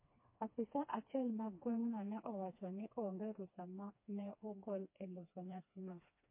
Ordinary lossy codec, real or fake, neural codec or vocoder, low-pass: MP3, 24 kbps; fake; codec, 16 kHz, 2 kbps, FreqCodec, smaller model; 3.6 kHz